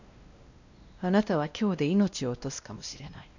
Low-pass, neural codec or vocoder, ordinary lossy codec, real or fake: 7.2 kHz; codec, 16 kHz, 1 kbps, X-Codec, WavLM features, trained on Multilingual LibriSpeech; none; fake